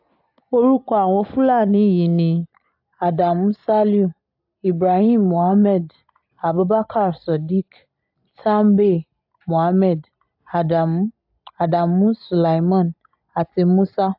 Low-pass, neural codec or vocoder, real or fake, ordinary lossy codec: 5.4 kHz; none; real; AAC, 48 kbps